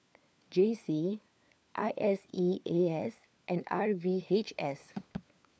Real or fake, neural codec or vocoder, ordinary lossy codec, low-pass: fake; codec, 16 kHz, 4 kbps, FunCodec, trained on LibriTTS, 50 frames a second; none; none